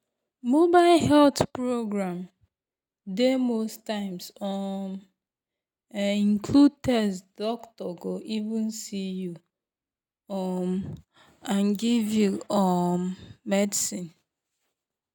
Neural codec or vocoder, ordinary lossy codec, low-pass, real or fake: none; none; 19.8 kHz; real